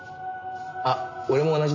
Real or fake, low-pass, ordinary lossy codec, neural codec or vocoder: real; 7.2 kHz; none; none